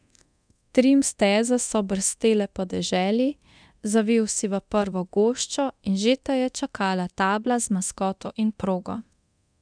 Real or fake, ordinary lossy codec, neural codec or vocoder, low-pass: fake; none; codec, 24 kHz, 0.9 kbps, DualCodec; 9.9 kHz